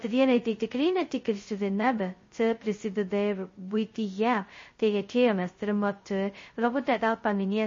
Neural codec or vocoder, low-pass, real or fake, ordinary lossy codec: codec, 16 kHz, 0.2 kbps, FocalCodec; 7.2 kHz; fake; MP3, 32 kbps